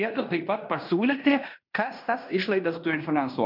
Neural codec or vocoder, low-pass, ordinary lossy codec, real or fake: codec, 16 kHz in and 24 kHz out, 0.9 kbps, LongCat-Audio-Codec, fine tuned four codebook decoder; 5.4 kHz; MP3, 48 kbps; fake